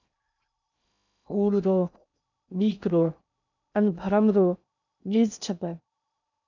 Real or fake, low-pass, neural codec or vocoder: fake; 7.2 kHz; codec, 16 kHz in and 24 kHz out, 0.6 kbps, FocalCodec, streaming, 2048 codes